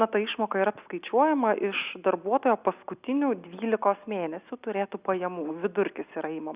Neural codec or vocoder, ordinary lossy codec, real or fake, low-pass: none; Opus, 64 kbps; real; 3.6 kHz